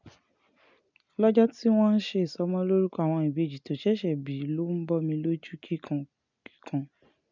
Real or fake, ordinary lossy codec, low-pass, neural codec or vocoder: real; none; 7.2 kHz; none